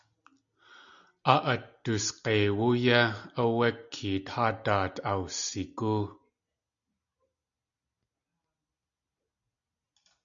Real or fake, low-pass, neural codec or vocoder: real; 7.2 kHz; none